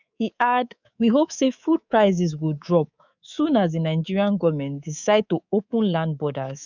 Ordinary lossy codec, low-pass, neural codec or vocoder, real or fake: none; 7.2 kHz; codec, 24 kHz, 3.1 kbps, DualCodec; fake